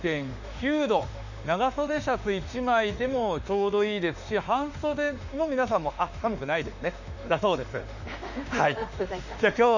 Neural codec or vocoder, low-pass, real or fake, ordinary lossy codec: autoencoder, 48 kHz, 32 numbers a frame, DAC-VAE, trained on Japanese speech; 7.2 kHz; fake; none